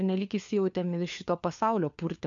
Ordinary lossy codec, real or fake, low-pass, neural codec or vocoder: MP3, 64 kbps; fake; 7.2 kHz; codec, 16 kHz, 4 kbps, FunCodec, trained on LibriTTS, 50 frames a second